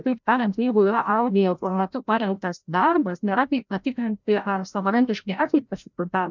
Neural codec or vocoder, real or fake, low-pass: codec, 16 kHz, 0.5 kbps, FreqCodec, larger model; fake; 7.2 kHz